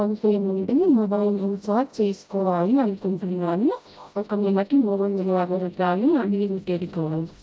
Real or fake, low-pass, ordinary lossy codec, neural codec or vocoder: fake; none; none; codec, 16 kHz, 0.5 kbps, FreqCodec, smaller model